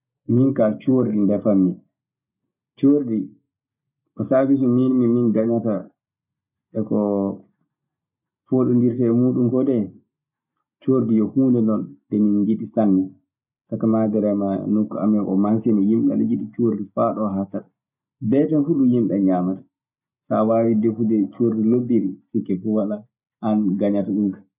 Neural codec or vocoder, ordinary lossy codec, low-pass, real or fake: none; none; 3.6 kHz; real